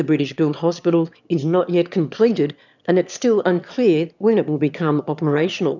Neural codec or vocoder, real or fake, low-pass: autoencoder, 22.05 kHz, a latent of 192 numbers a frame, VITS, trained on one speaker; fake; 7.2 kHz